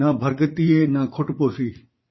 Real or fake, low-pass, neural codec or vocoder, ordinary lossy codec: fake; 7.2 kHz; vocoder, 44.1 kHz, 80 mel bands, Vocos; MP3, 24 kbps